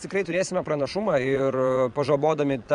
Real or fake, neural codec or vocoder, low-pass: fake; vocoder, 22.05 kHz, 80 mel bands, Vocos; 9.9 kHz